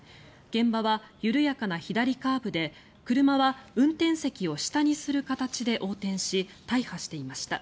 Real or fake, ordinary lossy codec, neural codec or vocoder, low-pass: real; none; none; none